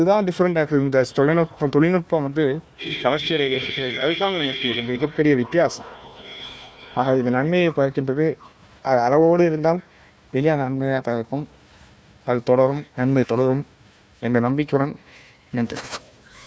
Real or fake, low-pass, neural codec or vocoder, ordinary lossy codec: fake; none; codec, 16 kHz, 1 kbps, FunCodec, trained on Chinese and English, 50 frames a second; none